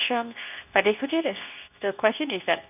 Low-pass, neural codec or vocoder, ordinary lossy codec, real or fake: 3.6 kHz; codec, 24 kHz, 0.9 kbps, WavTokenizer, medium speech release version 2; none; fake